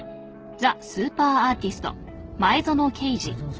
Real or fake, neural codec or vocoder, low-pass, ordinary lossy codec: real; none; 7.2 kHz; Opus, 16 kbps